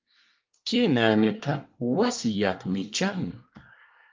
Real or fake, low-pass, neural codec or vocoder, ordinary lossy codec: fake; 7.2 kHz; codec, 24 kHz, 1 kbps, SNAC; Opus, 32 kbps